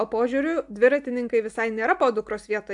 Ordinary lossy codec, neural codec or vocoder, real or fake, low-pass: AAC, 64 kbps; none; real; 10.8 kHz